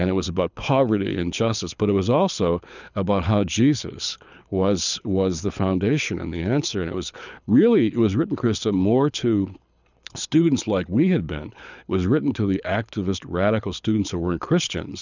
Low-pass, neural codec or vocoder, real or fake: 7.2 kHz; codec, 24 kHz, 6 kbps, HILCodec; fake